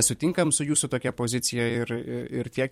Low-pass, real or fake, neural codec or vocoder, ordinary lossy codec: 19.8 kHz; fake; vocoder, 44.1 kHz, 128 mel bands every 256 samples, BigVGAN v2; MP3, 64 kbps